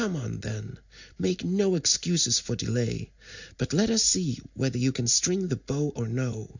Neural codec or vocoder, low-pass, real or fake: none; 7.2 kHz; real